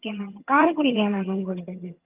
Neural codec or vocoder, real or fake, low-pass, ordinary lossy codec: vocoder, 22.05 kHz, 80 mel bands, HiFi-GAN; fake; 3.6 kHz; Opus, 32 kbps